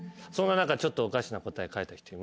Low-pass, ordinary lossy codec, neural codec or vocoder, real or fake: none; none; none; real